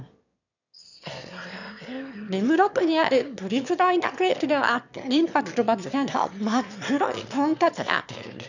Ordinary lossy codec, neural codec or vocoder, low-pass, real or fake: none; autoencoder, 22.05 kHz, a latent of 192 numbers a frame, VITS, trained on one speaker; 7.2 kHz; fake